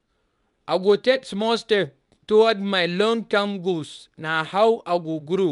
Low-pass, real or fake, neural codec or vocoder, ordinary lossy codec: 10.8 kHz; fake; codec, 24 kHz, 0.9 kbps, WavTokenizer, medium speech release version 2; none